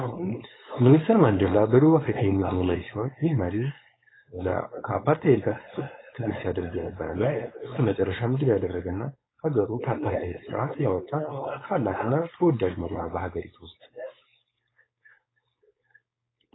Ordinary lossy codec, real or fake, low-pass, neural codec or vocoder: AAC, 16 kbps; fake; 7.2 kHz; codec, 16 kHz, 4.8 kbps, FACodec